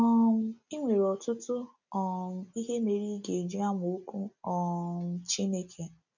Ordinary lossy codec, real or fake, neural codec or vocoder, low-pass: none; real; none; 7.2 kHz